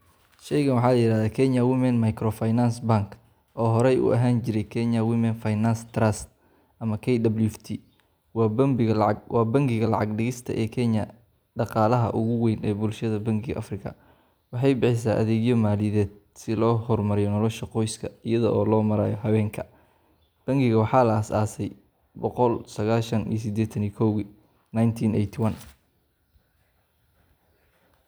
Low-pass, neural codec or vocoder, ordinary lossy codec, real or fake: none; none; none; real